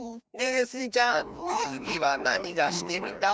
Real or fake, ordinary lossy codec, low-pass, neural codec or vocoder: fake; none; none; codec, 16 kHz, 1 kbps, FreqCodec, larger model